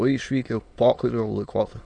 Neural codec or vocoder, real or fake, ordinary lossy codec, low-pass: autoencoder, 22.05 kHz, a latent of 192 numbers a frame, VITS, trained on many speakers; fake; Opus, 64 kbps; 9.9 kHz